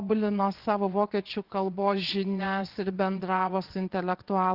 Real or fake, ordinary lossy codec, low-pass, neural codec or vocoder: fake; Opus, 16 kbps; 5.4 kHz; vocoder, 22.05 kHz, 80 mel bands, WaveNeXt